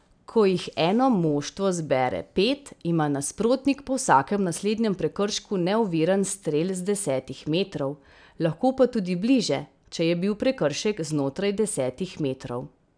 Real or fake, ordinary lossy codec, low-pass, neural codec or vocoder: real; none; 9.9 kHz; none